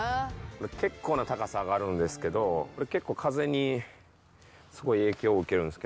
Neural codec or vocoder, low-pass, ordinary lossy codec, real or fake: none; none; none; real